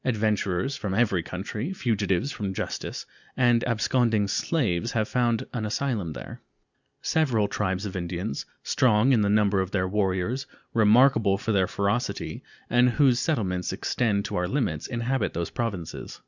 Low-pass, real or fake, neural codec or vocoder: 7.2 kHz; real; none